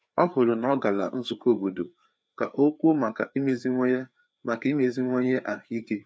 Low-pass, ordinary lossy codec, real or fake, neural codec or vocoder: none; none; fake; codec, 16 kHz, 4 kbps, FreqCodec, larger model